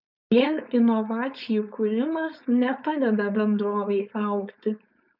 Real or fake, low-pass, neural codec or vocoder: fake; 5.4 kHz; codec, 16 kHz, 4.8 kbps, FACodec